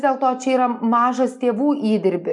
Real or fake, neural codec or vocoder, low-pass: real; none; 10.8 kHz